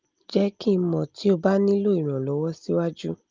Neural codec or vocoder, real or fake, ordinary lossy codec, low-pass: none; real; Opus, 24 kbps; 7.2 kHz